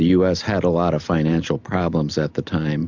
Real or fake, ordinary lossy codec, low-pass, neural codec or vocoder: real; MP3, 64 kbps; 7.2 kHz; none